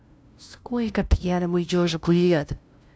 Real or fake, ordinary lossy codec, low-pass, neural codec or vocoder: fake; none; none; codec, 16 kHz, 0.5 kbps, FunCodec, trained on LibriTTS, 25 frames a second